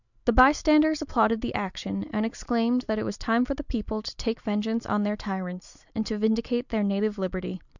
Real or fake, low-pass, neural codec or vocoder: real; 7.2 kHz; none